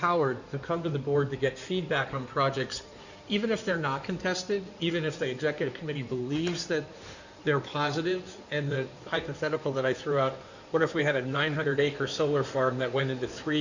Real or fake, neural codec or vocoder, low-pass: fake; codec, 16 kHz in and 24 kHz out, 2.2 kbps, FireRedTTS-2 codec; 7.2 kHz